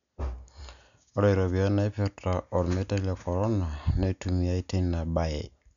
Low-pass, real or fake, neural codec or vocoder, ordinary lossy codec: 7.2 kHz; real; none; none